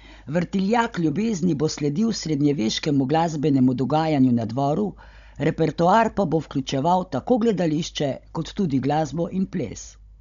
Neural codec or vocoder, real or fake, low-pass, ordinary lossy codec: codec, 16 kHz, 16 kbps, FunCodec, trained on Chinese and English, 50 frames a second; fake; 7.2 kHz; none